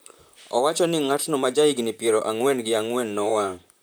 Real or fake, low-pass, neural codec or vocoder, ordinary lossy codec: fake; none; vocoder, 44.1 kHz, 128 mel bands, Pupu-Vocoder; none